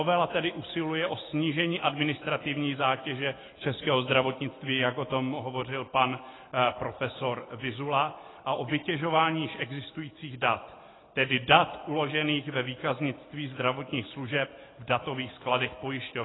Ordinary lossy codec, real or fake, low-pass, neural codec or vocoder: AAC, 16 kbps; real; 7.2 kHz; none